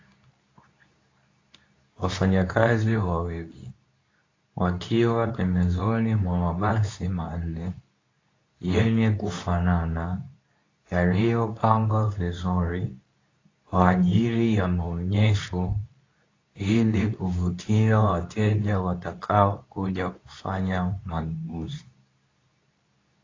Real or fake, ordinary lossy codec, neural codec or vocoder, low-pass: fake; AAC, 32 kbps; codec, 24 kHz, 0.9 kbps, WavTokenizer, medium speech release version 2; 7.2 kHz